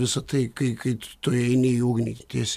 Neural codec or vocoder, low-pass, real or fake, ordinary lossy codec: none; 14.4 kHz; real; AAC, 96 kbps